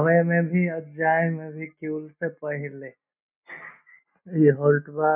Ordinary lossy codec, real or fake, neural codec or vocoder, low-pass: none; real; none; 3.6 kHz